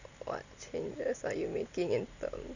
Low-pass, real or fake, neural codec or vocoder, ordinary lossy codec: 7.2 kHz; real; none; none